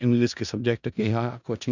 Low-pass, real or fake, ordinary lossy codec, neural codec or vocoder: 7.2 kHz; fake; none; codec, 16 kHz in and 24 kHz out, 0.4 kbps, LongCat-Audio-Codec, four codebook decoder